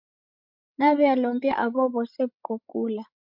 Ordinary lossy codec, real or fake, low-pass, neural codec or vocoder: MP3, 48 kbps; fake; 5.4 kHz; codec, 16 kHz, 16 kbps, FreqCodec, larger model